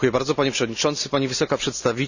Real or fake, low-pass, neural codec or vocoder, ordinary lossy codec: real; 7.2 kHz; none; none